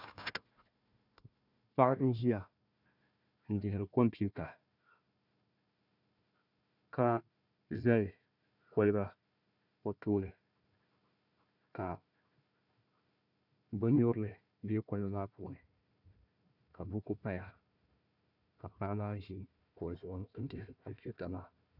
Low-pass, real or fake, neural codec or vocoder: 5.4 kHz; fake; codec, 16 kHz, 1 kbps, FunCodec, trained on Chinese and English, 50 frames a second